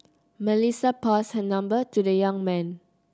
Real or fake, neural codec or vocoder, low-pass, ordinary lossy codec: real; none; none; none